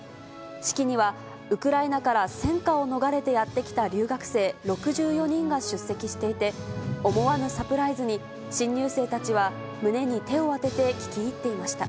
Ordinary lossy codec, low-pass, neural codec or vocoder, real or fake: none; none; none; real